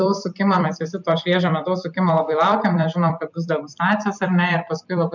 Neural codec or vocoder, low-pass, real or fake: vocoder, 44.1 kHz, 128 mel bands every 256 samples, BigVGAN v2; 7.2 kHz; fake